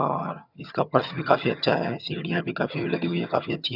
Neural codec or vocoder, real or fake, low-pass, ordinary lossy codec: vocoder, 22.05 kHz, 80 mel bands, HiFi-GAN; fake; 5.4 kHz; none